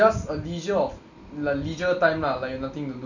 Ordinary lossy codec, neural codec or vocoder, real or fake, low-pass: none; none; real; 7.2 kHz